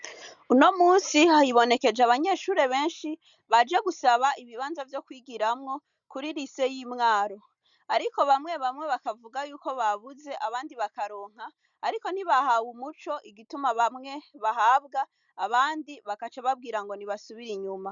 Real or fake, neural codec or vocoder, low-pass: real; none; 7.2 kHz